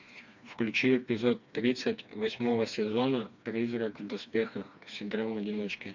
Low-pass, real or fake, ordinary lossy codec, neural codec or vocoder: 7.2 kHz; fake; MP3, 64 kbps; codec, 16 kHz, 2 kbps, FreqCodec, smaller model